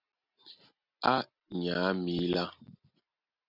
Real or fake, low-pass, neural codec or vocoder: real; 5.4 kHz; none